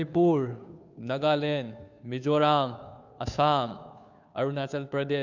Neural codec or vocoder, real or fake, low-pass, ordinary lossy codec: codec, 16 kHz, 2 kbps, FunCodec, trained on Chinese and English, 25 frames a second; fake; 7.2 kHz; none